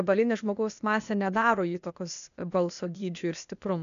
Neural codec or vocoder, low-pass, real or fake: codec, 16 kHz, 0.8 kbps, ZipCodec; 7.2 kHz; fake